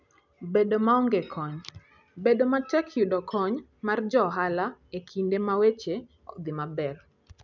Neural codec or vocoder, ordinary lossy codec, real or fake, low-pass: none; none; real; 7.2 kHz